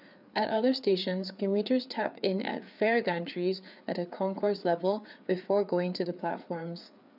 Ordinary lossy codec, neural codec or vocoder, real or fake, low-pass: none; codec, 16 kHz, 4 kbps, FreqCodec, larger model; fake; 5.4 kHz